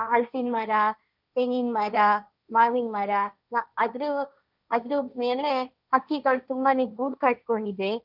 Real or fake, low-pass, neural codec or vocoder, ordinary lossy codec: fake; 5.4 kHz; codec, 16 kHz, 1.1 kbps, Voila-Tokenizer; none